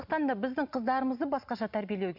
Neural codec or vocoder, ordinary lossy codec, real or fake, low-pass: none; none; real; 5.4 kHz